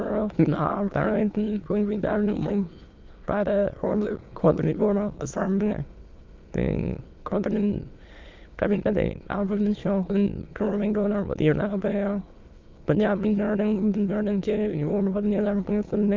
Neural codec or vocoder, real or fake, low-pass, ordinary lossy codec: autoencoder, 22.05 kHz, a latent of 192 numbers a frame, VITS, trained on many speakers; fake; 7.2 kHz; Opus, 24 kbps